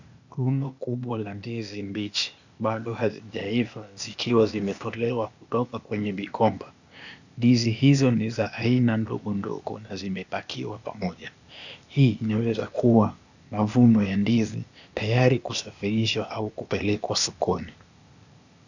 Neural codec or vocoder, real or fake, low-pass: codec, 16 kHz, 0.8 kbps, ZipCodec; fake; 7.2 kHz